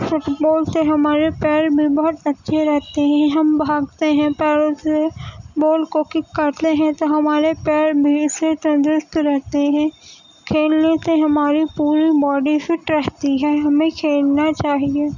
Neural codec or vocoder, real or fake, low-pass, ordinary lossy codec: none; real; 7.2 kHz; none